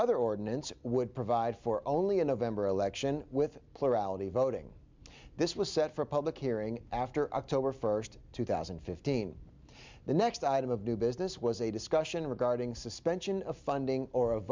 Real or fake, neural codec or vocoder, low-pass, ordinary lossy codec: real; none; 7.2 kHz; MP3, 64 kbps